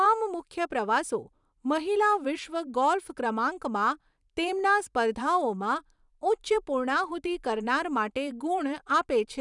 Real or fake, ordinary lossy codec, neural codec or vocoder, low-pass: fake; none; vocoder, 44.1 kHz, 128 mel bands every 512 samples, BigVGAN v2; 10.8 kHz